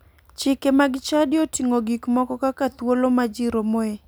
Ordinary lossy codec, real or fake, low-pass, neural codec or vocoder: none; real; none; none